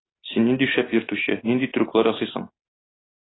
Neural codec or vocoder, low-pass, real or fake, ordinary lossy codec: none; 7.2 kHz; real; AAC, 16 kbps